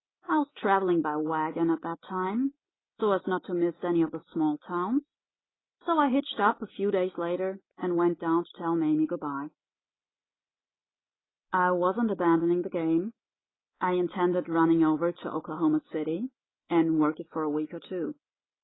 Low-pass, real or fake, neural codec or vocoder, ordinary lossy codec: 7.2 kHz; real; none; AAC, 16 kbps